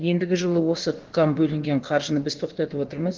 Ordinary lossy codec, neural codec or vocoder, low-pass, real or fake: Opus, 16 kbps; codec, 16 kHz, about 1 kbps, DyCAST, with the encoder's durations; 7.2 kHz; fake